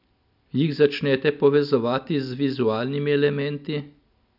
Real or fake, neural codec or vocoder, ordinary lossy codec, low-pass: real; none; none; 5.4 kHz